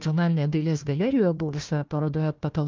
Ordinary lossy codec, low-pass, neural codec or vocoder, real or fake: Opus, 24 kbps; 7.2 kHz; codec, 16 kHz, 1 kbps, FunCodec, trained on Chinese and English, 50 frames a second; fake